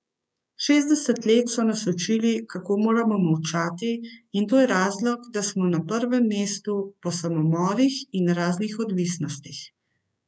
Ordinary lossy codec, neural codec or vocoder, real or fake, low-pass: none; codec, 16 kHz, 6 kbps, DAC; fake; none